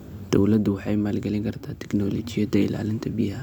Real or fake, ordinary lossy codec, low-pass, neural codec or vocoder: fake; none; 19.8 kHz; vocoder, 44.1 kHz, 128 mel bands every 256 samples, BigVGAN v2